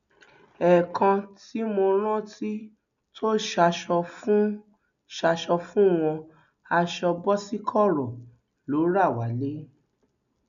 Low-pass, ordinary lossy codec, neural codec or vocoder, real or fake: 7.2 kHz; none; none; real